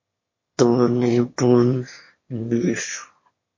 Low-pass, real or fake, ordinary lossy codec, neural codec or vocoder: 7.2 kHz; fake; MP3, 32 kbps; autoencoder, 22.05 kHz, a latent of 192 numbers a frame, VITS, trained on one speaker